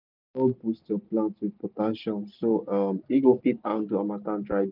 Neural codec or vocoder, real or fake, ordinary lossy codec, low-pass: none; real; none; 5.4 kHz